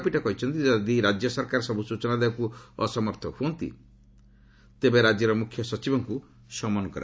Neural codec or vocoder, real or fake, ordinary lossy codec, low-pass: none; real; none; none